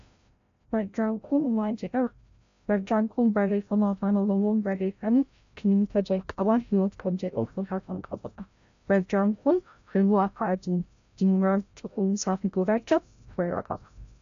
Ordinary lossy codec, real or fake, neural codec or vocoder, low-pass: AAC, 64 kbps; fake; codec, 16 kHz, 0.5 kbps, FreqCodec, larger model; 7.2 kHz